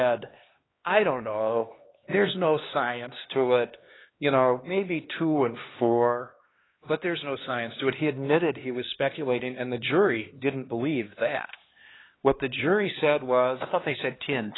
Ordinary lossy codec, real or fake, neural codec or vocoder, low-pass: AAC, 16 kbps; fake; codec, 16 kHz, 2 kbps, X-Codec, HuBERT features, trained on LibriSpeech; 7.2 kHz